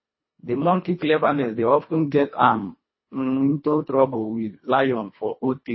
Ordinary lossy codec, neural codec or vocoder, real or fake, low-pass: MP3, 24 kbps; codec, 24 kHz, 1.5 kbps, HILCodec; fake; 7.2 kHz